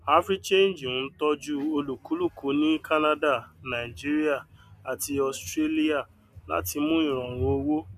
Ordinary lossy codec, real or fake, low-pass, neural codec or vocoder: none; real; 14.4 kHz; none